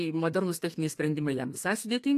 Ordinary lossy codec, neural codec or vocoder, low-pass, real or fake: AAC, 64 kbps; codec, 32 kHz, 1.9 kbps, SNAC; 14.4 kHz; fake